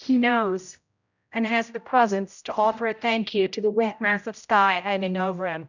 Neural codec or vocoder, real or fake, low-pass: codec, 16 kHz, 0.5 kbps, X-Codec, HuBERT features, trained on general audio; fake; 7.2 kHz